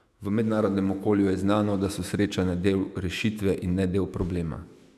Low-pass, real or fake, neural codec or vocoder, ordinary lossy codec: 14.4 kHz; fake; autoencoder, 48 kHz, 128 numbers a frame, DAC-VAE, trained on Japanese speech; none